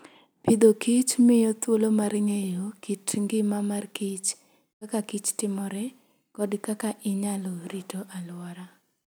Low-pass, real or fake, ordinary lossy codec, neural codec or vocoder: none; real; none; none